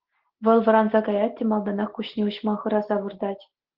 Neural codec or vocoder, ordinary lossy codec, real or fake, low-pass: none; Opus, 16 kbps; real; 5.4 kHz